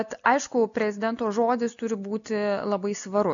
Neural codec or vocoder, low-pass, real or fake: none; 7.2 kHz; real